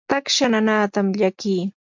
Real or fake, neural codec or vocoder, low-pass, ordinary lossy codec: real; none; 7.2 kHz; MP3, 64 kbps